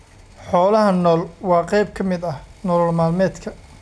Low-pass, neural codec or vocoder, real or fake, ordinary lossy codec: none; none; real; none